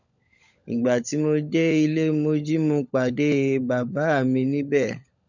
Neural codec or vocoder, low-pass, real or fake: codec, 16 kHz, 16 kbps, FunCodec, trained on LibriTTS, 50 frames a second; 7.2 kHz; fake